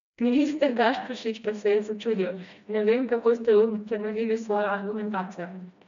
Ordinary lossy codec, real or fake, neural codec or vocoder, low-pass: MP3, 64 kbps; fake; codec, 16 kHz, 1 kbps, FreqCodec, smaller model; 7.2 kHz